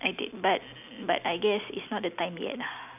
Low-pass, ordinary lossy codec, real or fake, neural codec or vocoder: 3.6 kHz; none; real; none